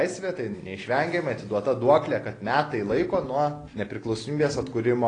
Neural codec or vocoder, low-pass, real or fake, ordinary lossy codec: none; 9.9 kHz; real; AAC, 32 kbps